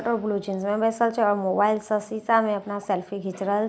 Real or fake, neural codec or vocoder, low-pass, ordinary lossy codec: real; none; none; none